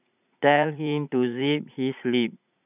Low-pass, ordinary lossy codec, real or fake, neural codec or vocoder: 3.6 kHz; none; fake; vocoder, 44.1 kHz, 80 mel bands, Vocos